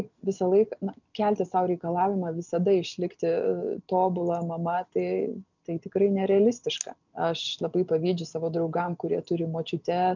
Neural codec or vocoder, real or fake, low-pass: none; real; 7.2 kHz